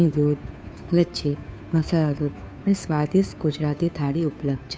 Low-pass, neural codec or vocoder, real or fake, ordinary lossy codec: none; codec, 16 kHz, 2 kbps, FunCodec, trained on Chinese and English, 25 frames a second; fake; none